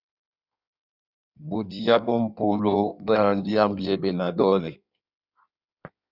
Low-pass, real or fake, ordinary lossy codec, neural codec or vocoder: 5.4 kHz; fake; Opus, 64 kbps; codec, 16 kHz in and 24 kHz out, 1.1 kbps, FireRedTTS-2 codec